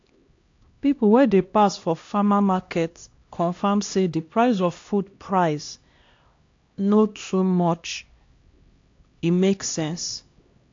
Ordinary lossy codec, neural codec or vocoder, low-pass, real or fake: AAC, 48 kbps; codec, 16 kHz, 1 kbps, X-Codec, HuBERT features, trained on LibriSpeech; 7.2 kHz; fake